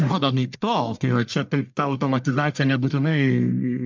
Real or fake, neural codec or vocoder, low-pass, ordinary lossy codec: fake; codec, 44.1 kHz, 1.7 kbps, Pupu-Codec; 7.2 kHz; MP3, 64 kbps